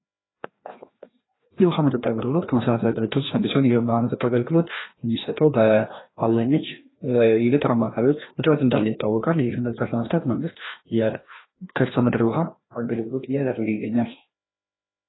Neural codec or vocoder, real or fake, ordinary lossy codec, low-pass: codec, 16 kHz, 1 kbps, FreqCodec, larger model; fake; AAC, 16 kbps; 7.2 kHz